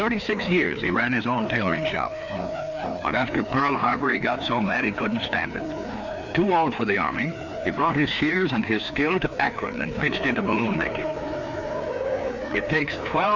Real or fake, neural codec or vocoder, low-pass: fake; codec, 16 kHz, 2 kbps, FreqCodec, larger model; 7.2 kHz